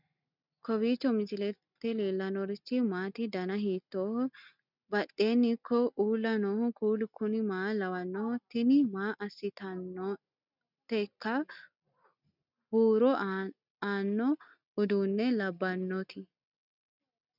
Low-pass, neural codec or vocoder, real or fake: 5.4 kHz; none; real